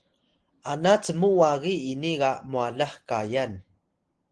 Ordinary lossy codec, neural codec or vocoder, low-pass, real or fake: Opus, 16 kbps; none; 9.9 kHz; real